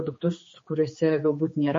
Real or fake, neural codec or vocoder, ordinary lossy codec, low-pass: fake; codec, 16 kHz, 4 kbps, X-Codec, HuBERT features, trained on general audio; MP3, 32 kbps; 7.2 kHz